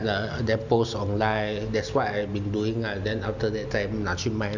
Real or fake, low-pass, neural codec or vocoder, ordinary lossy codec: real; 7.2 kHz; none; none